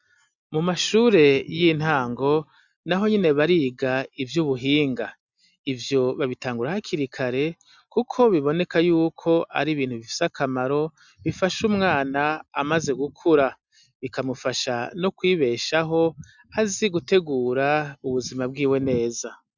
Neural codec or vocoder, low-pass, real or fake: none; 7.2 kHz; real